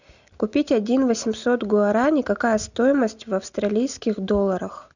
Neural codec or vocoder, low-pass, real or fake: none; 7.2 kHz; real